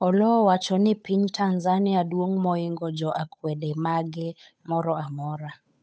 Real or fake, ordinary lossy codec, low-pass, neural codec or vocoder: fake; none; none; codec, 16 kHz, 8 kbps, FunCodec, trained on Chinese and English, 25 frames a second